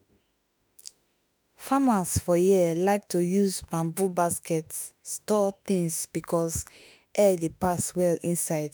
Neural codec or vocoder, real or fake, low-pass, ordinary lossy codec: autoencoder, 48 kHz, 32 numbers a frame, DAC-VAE, trained on Japanese speech; fake; none; none